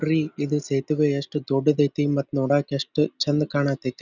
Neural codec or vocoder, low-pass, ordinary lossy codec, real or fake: none; 7.2 kHz; none; real